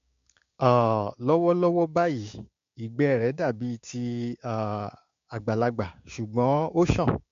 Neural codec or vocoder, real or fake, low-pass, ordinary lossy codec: codec, 16 kHz, 6 kbps, DAC; fake; 7.2 kHz; MP3, 48 kbps